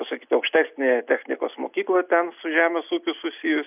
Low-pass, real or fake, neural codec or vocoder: 3.6 kHz; real; none